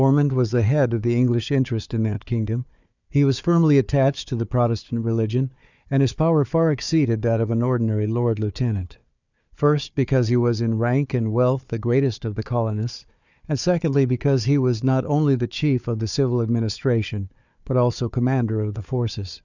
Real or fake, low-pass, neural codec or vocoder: fake; 7.2 kHz; codec, 16 kHz, 4 kbps, FunCodec, trained on Chinese and English, 50 frames a second